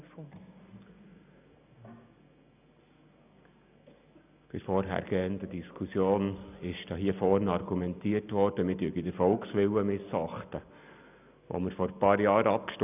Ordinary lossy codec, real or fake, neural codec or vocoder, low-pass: none; real; none; 3.6 kHz